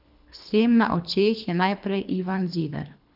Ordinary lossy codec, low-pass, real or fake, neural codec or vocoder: none; 5.4 kHz; fake; codec, 24 kHz, 3 kbps, HILCodec